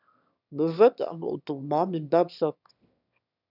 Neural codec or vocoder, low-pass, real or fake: autoencoder, 22.05 kHz, a latent of 192 numbers a frame, VITS, trained on one speaker; 5.4 kHz; fake